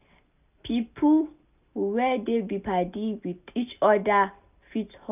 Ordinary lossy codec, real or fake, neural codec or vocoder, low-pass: none; real; none; 3.6 kHz